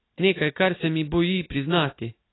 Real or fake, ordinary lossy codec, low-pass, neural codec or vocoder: real; AAC, 16 kbps; 7.2 kHz; none